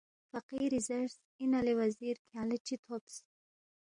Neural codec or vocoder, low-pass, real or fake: none; 9.9 kHz; real